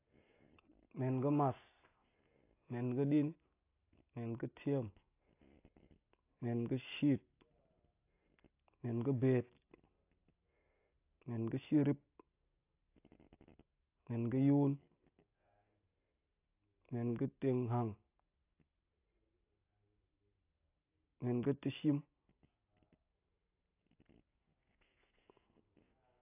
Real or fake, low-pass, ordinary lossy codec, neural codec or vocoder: real; 3.6 kHz; AAC, 24 kbps; none